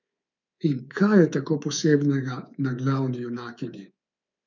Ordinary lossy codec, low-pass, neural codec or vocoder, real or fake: none; 7.2 kHz; codec, 24 kHz, 3.1 kbps, DualCodec; fake